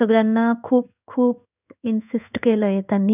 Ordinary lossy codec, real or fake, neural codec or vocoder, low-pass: none; fake; codec, 16 kHz in and 24 kHz out, 1 kbps, XY-Tokenizer; 3.6 kHz